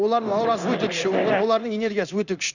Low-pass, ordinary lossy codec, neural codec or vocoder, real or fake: 7.2 kHz; none; codec, 16 kHz in and 24 kHz out, 1 kbps, XY-Tokenizer; fake